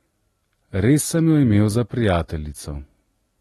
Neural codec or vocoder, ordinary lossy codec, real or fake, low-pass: none; AAC, 32 kbps; real; 19.8 kHz